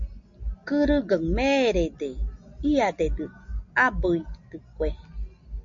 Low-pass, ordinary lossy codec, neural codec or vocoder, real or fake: 7.2 kHz; MP3, 48 kbps; none; real